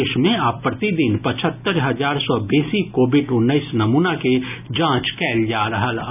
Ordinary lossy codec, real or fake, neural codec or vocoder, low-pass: none; real; none; 3.6 kHz